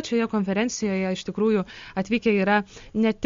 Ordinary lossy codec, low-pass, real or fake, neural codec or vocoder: MP3, 48 kbps; 7.2 kHz; real; none